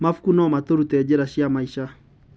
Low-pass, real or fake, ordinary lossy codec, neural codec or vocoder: none; real; none; none